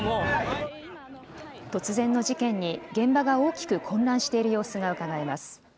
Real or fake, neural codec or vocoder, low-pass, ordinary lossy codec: real; none; none; none